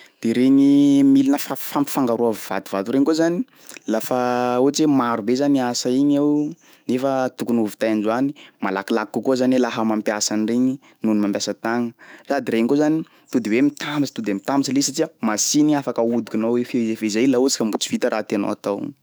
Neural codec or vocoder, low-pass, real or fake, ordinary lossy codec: autoencoder, 48 kHz, 128 numbers a frame, DAC-VAE, trained on Japanese speech; none; fake; none